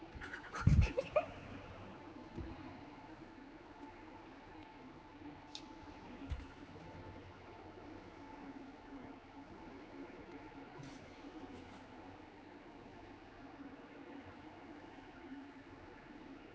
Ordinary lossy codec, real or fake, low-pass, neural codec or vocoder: none; fake; none; codec, 16 kHz, 4 kbps, X-Codec, HuBERT features, trained on general audio